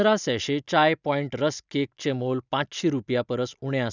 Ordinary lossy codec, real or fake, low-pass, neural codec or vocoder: none; real; 7.2 kHz; none